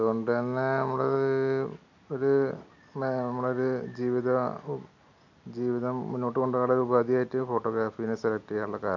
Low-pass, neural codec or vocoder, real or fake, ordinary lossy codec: 7.2 kHz; none; real; none